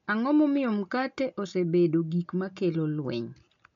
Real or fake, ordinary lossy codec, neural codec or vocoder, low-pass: real; MP3, 64 kbps; none; 7.2 kHz